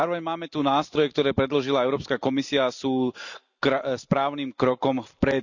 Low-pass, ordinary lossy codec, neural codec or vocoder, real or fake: 7.2 kHz; none; none; real